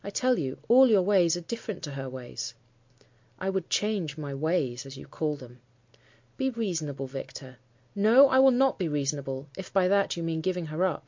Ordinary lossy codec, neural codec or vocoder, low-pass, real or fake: MP3, 48 kbps; none; 7.2 kHz; real